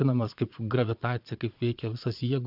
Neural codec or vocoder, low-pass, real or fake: vocoder, 22.05 kHz, 80 mel bands, Vocos; 5.4 kHz; fake